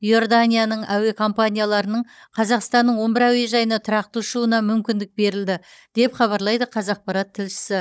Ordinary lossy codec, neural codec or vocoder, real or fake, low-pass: none; codec, 16 kHz, 16 kbps, FunCodec, trained on Chinese and English, 50 frames a second; fake; none